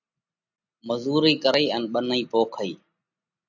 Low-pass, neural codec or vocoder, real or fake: 7.2 kHz; none; real